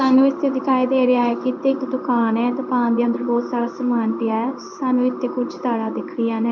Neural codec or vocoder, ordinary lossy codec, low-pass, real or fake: codec, 16 kHz in and 24 kHz out, 1 kbps, XY-Tokenizer; none; 7.2 kHz; fake